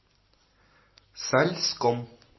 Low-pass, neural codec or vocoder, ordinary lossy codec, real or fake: 7.2 kHz; none; MP3, 24 kbps; real